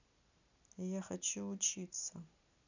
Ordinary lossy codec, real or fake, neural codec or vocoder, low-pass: none; real; none; 7.2 kHz